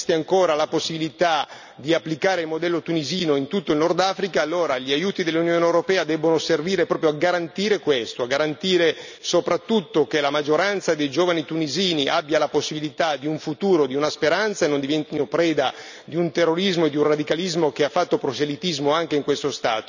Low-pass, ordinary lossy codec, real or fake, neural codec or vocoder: 7.2 kHz; none; real; none